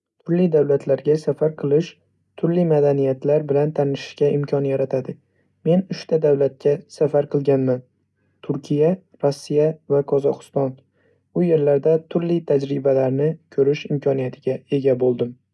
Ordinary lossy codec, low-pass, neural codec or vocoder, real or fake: none; none; none; real